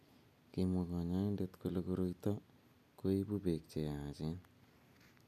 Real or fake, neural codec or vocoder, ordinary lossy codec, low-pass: real; none; none; 14.4 kHz